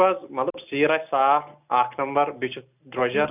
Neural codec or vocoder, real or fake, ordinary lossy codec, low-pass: none; real; none; 3.6 kHz